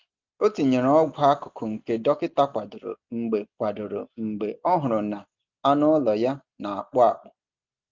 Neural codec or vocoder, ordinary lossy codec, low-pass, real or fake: none; Opus, 24 kbps; 7.2 kHz; real